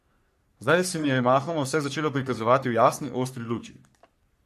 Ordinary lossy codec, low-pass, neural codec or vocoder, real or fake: MP3, 64 kbps; 14.4 kHz; codec, 44.1 kHz, 3.4 kbps, Pupu-Codec; fake